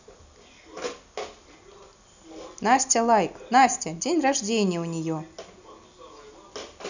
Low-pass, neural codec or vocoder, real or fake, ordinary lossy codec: 7.2 kHz; none; real; none